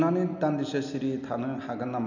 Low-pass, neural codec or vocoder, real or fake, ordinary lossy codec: 7.2 kHz; none; real; none